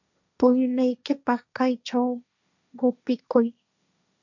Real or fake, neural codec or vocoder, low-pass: fake; codec, 16 kHz, 1.1 kbps, Voila-Tokenizer; 7.2 kHz